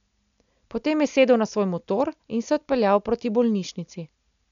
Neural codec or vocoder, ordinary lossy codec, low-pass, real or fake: none; none; 7.2 kHz; real